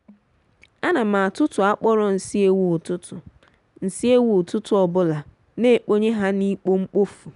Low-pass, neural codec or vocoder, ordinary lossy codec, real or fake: 10.8 kHz; none; none; real